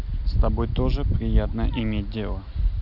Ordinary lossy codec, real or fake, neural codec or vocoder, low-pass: none; real; none; 5.4 kHz